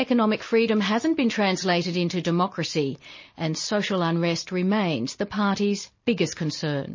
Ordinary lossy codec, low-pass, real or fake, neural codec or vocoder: MP3, 32 kbps; 7.2 kHz; real; none